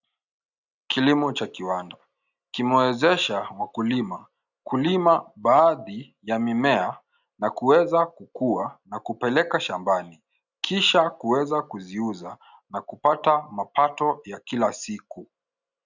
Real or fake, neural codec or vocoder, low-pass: real; none; 7.2 kHz